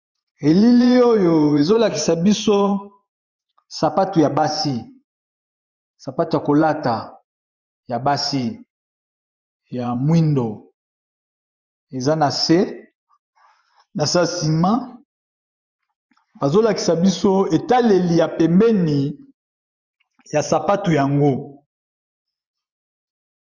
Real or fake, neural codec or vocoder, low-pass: fake; vocoder, 24 kHz, 100 mel bands, Vocos; 7.2 kHz